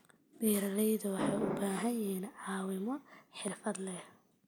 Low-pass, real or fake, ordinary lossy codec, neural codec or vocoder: none; real; none; none